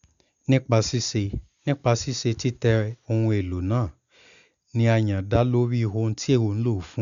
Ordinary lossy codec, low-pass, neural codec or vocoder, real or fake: none; 7.2 kHz; none; real